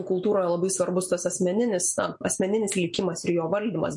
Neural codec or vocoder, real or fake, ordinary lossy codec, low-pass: none; real; MP3, 48 kbps; 10.8 kHz